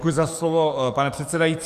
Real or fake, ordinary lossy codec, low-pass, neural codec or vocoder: fake; Opus, 64 kbps; 14.4 kHz; codec, 44.1 kHz, 7.8 kbps, DAC